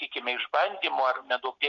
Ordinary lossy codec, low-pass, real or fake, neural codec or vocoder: MP3, 48 kbps; 7.2 kHz; real; none